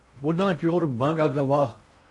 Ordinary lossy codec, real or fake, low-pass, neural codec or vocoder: MP3, 48 kbps; fake; 10.8 kHz; codec, 16 kHz in and 24 kHz out, 0.6 kbps, FocalCodec, streaming, 2048 codes